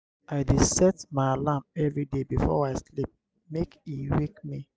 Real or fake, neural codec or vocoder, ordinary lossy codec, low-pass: real; none; none; none